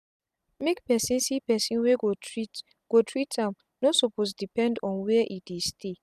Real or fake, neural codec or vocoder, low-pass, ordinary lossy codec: real; none; 14.4 kHz; none